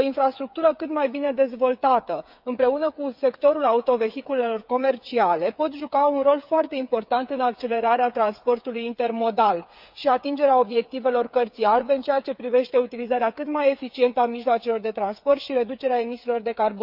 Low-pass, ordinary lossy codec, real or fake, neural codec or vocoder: 5.4 kHz; none; fake; codec, 16 kHz, 8 kbps, FreqCodec, smaller model